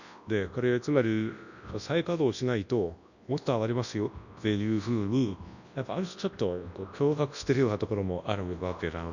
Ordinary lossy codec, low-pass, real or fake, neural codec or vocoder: none; 7.2 kHz; fake; codec, 24 kHz, 0.9 kbps, WavTokenizer, large speech release